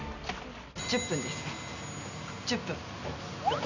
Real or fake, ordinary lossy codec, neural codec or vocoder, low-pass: real; none; none; 7.2 kHz